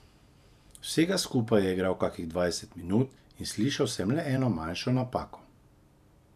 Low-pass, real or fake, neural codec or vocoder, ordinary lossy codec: 14.4 kHz; fake; vocoder, 44.1 kHz, 128 mel bands every 512 samples, BigVGAN v2; none